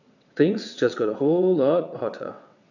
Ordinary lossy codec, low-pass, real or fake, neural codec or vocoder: none; 7.2 kHz; fake; vocoder, 22.05 kHz, 80 mel bands, WaveNeXt